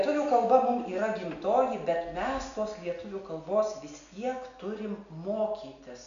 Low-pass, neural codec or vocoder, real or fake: 7.2 kHz; none; real